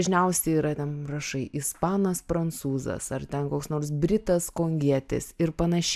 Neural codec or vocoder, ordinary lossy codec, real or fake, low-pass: none; AAC, 96 kbps; real; 14.4 kHz